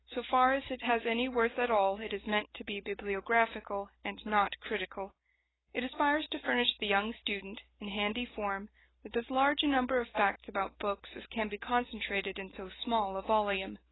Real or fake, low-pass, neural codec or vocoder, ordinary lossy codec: real; 7.2 kHz; none; AAC, 16 kbps